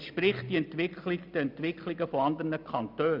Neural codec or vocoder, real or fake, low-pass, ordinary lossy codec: none; real; 5.4 kHz; none